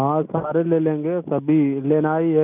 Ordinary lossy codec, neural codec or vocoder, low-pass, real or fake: none; none; 3.6 kHz; real